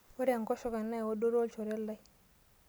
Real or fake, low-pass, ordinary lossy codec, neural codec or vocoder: real; none; none; none